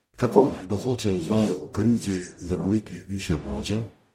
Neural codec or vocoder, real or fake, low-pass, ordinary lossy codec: codec, 44.1 kHz, 0.9 kbps, DAC; fake; 19.8 kHz; MP3, 64 kbps